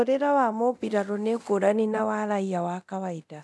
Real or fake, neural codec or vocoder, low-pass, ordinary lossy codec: fake; codec, 24 kHz, 0.9 kbps, DualCodec; none; none